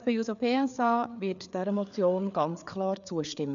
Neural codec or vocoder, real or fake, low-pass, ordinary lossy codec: codec, 16 kHz, 4 kbps, FreqCodec, larger model; fake; 7.2 kHz; none